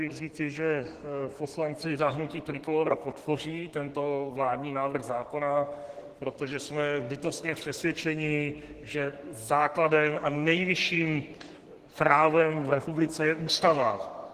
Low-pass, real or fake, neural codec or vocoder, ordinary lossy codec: 14.4 kHz; fake; codec, 32 kHz, 1.9 kbps, SNAC; Opus, 16 kbps